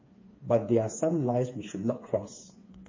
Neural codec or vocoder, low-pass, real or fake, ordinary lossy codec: codec, 16 kHz, 4 kbps, FreqCodec, smaller model; 7.2 kHz; fake; MP3, 32 kbps